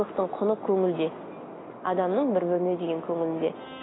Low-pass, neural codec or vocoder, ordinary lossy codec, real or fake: 7.2 kHz; none; AAC, 16 kbps; real